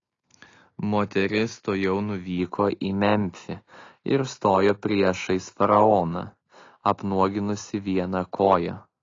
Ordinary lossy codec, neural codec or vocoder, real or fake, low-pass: AAC, 32 kbps; none; real; 7.2 kHz